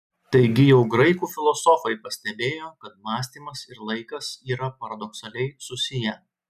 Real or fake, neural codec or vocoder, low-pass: real; none; 14.4 kHz